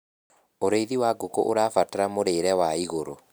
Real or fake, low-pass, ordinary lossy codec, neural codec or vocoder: real; none; none; none